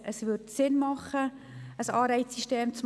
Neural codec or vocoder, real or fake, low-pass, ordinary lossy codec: none; real; none; none